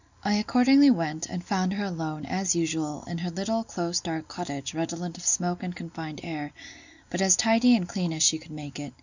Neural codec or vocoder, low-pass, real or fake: none; 7.2 kHz; real